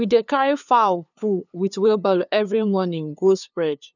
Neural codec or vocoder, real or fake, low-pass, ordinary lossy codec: codec, 16 kHz, 2 kbps, FunCodec, trained on LibriTTS, 25 frames a second; fake; 7.2 kHz; none